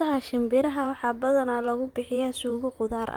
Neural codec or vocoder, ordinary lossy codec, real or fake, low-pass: vocoder, 44.1 kHz, 128 mel bands, Pupu-Vocoder; Opus, 32 kbps; fake; 19.8 kHz